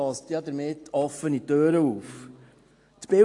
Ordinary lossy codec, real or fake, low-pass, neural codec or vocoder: AAC, 48 kbps; real; 10.8 kHz; none